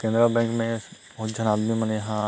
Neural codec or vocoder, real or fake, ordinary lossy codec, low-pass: none; real; none; none